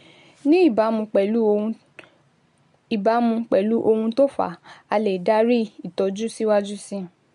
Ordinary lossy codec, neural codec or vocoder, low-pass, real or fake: MP3, 64 kbps; none; 10.8 kHz; real